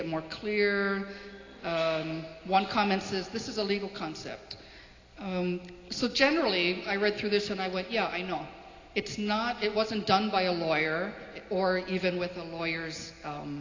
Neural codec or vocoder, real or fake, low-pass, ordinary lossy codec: none; real; 7.2 kHz; AAC, 32 kbps